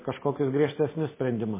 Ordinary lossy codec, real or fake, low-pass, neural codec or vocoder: MP3, 16 kbps; real; 3.6 kHz; none